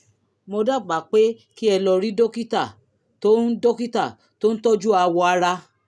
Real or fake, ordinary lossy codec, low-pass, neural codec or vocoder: real; none; none; none